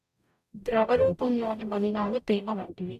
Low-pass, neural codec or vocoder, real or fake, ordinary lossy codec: 14.4 kHz; codec, 44.1 kHz, 0.9 kbps, DAC; fake; none